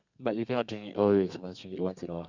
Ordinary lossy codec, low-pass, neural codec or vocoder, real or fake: Opus, 64 kbps; 7.2 kHz; codec, 32 kHz, 1.9 kbps, SNAC; fake